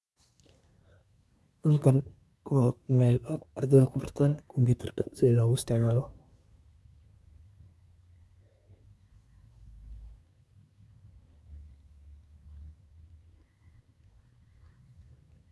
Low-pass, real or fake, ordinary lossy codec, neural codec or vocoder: none; fake; none; codec, 24 kHz, 1 kbps, SNAC